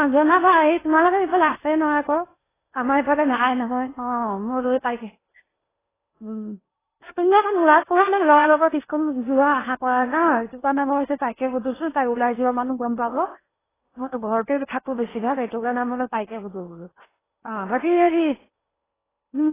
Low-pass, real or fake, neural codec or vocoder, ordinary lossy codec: 3.6 kHz; fake; codec, 16 kHz in and 24 kHz out, 0.8 kbps, FocalCodec, streaming, 65536 codes; AAC, 16 kbps